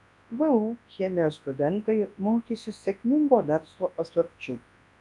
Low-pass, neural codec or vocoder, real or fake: 10.8 kHz; codec, 24 kHz, 0.9 kbps, WavTokenizer, large speech release; fake